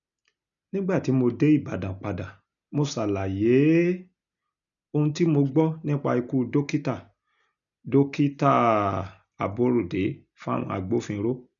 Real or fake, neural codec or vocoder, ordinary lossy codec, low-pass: real; none; none; 7.2 kHz